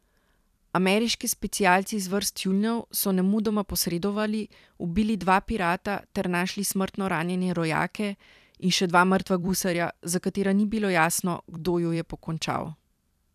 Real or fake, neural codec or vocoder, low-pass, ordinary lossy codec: fake; vocoder, 44.1 kHz, 128 mel bands every 256 samples, BigVGAN v2; 14.4 kHz; none